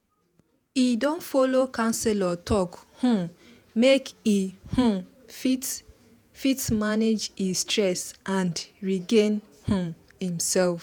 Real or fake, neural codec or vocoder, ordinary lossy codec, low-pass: fake; vocoder, 48 kHz, 128 mel bands, Vocos; none; none